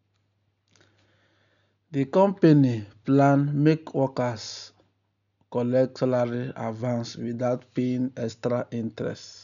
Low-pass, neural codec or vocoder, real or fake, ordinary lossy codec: 7.2 kHz; none; real; none